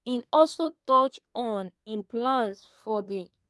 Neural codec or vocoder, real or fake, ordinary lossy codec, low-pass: codec, 24 kHz, 1 kbps, SNAC; fake; none; none